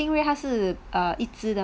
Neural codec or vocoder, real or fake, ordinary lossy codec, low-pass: none; real; none; none